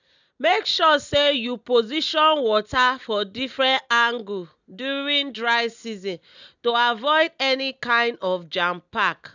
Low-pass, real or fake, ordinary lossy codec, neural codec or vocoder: 7.2 kHz; real; none; none